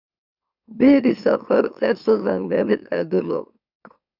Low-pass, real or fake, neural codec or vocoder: 5.4 kHz; fake; autoencoder, 44.1 kHz, a latent of 192 numbers a frame, MeloTTS